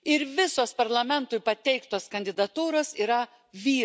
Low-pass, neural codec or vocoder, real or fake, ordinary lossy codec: none; none; real; none